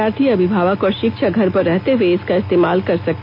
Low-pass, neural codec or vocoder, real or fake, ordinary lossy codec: 5.4 kHz; none; real; AAC, 48 kbps